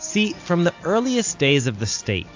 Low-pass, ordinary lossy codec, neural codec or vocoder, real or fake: 7.2 kHz; AAC, 48 kbps; none; real